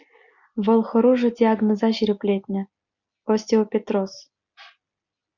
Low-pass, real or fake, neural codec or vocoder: 7.2 kHz; real; none